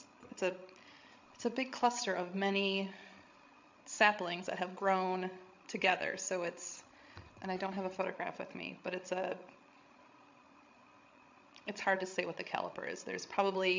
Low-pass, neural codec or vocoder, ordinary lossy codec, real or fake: 7.2 kHz; codec, 16 kHz, 16 kbps, FreqCodec, larger model; MP3, 64 kbps; fake